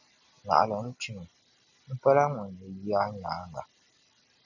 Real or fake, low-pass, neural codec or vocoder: real; 7.2 kHz; none